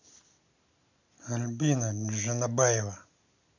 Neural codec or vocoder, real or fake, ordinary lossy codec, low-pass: none; real; none; 7.2 kHz